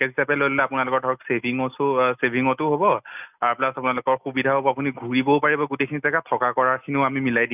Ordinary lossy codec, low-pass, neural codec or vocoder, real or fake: none; 3.6 kHz; none; real